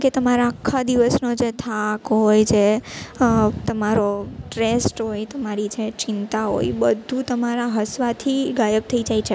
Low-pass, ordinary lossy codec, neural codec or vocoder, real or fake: none; none; none; real